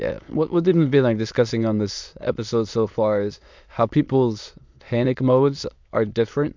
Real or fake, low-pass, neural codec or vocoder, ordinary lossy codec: fake; 7.2 kHz; autoencoder, 22.05 kHz, a latent of 192 numbers a frame, VITS, trained on many speakers; MP3, 64 kbps